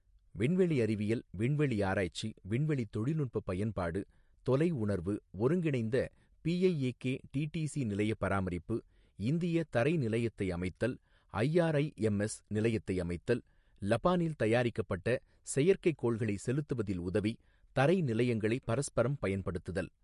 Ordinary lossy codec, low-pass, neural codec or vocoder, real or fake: MP3, 48 kbps; 14.4 kHz; none; real